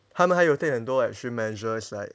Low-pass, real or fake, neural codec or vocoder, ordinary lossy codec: none; real; none; none